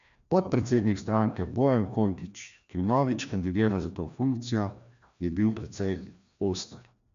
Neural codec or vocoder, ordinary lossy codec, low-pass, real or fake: codec, 16 kHz, 1 kbps, FreqCodec, larger model; MP3, 64 kbps; 7.2 kHz; fake